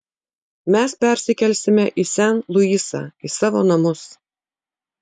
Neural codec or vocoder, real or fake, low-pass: none; real; 10.8 kHz